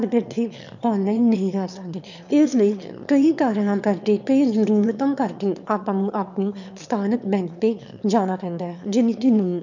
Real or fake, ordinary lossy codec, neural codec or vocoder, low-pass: fake; none; autoencoder, 22.05 kHz, a latent of 192 numbers a frame, VITS, trained on one speaker; 7.2 kHz